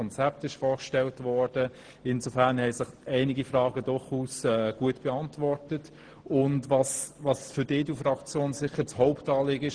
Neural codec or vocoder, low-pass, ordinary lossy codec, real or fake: none; 9.9 kHz; Opus, 16 kbps; real